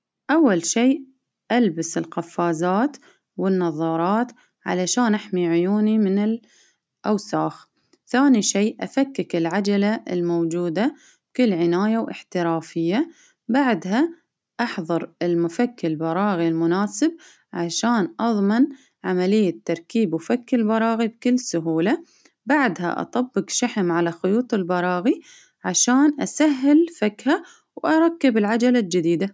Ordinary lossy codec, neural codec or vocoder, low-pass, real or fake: none; none; none; real